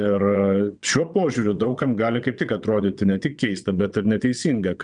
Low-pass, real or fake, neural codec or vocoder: 9.9 kHz; fake; vocoder, 22.05 kHz, 80 mel bands, WaveNeXt